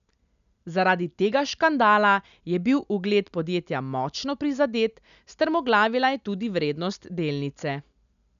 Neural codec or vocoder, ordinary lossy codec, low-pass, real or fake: none; none; 7.2 kHz; real